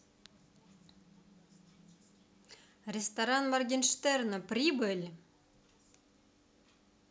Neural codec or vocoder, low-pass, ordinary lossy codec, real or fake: none; none; none; real